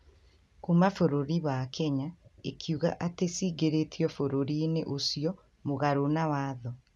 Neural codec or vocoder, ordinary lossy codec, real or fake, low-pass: none; none; real; none